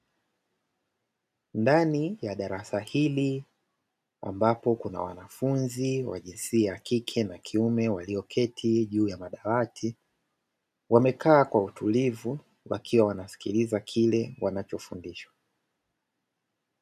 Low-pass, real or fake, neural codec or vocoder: 14.4 kHz; real; none